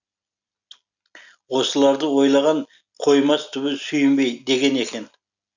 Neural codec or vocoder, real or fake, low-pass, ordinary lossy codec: none; real; 7.2 kHz; none